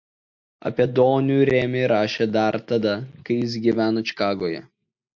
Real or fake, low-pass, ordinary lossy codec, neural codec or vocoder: real; 7.2 kHz; MP3, 48 kbps; none